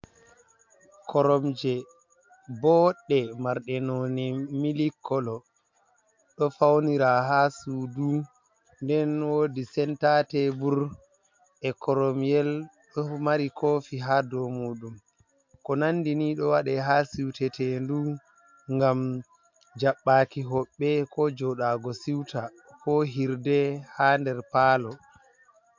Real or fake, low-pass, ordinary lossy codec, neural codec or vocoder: real; 7.2 kHz; MP3, 64 kbps; none